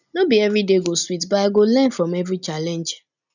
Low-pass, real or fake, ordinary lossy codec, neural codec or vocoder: 7.2 kHz; real; none; none